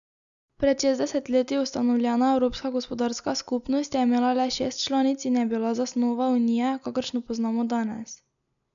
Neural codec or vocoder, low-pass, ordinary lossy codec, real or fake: none; 7.2 kHz; none; real